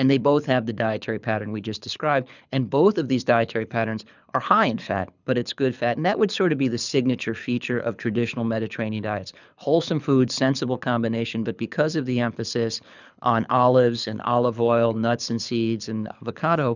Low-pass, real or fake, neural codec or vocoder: 7.2 kHz; fake; codec, 24 kHz, 6 kbps, HILCodec